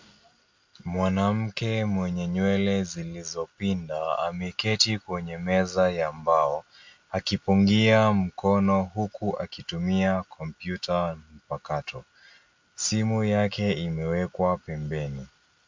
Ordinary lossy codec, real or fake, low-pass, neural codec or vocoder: MP3, 48 kbps; real; 7.2 kHz; none